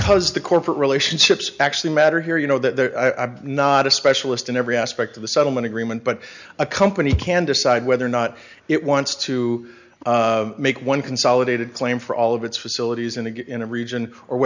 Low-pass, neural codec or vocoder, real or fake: 7.2 kHz; none; real